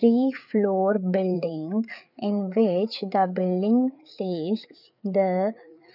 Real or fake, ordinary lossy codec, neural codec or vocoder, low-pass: fake; none; codec, 16 kHz, 4 kbps, FreqCodec, larger model; 5.4 kHz